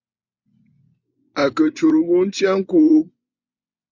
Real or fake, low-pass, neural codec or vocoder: fake; 7.2 kHz; vocoder, 24 kHz, 100 mel bands, Vocos